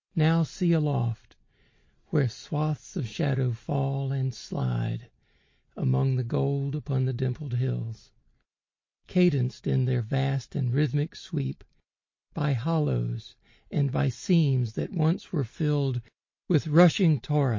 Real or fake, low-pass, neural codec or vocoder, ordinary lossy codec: real; 7.2 kHz; none; MP3, 32 kbps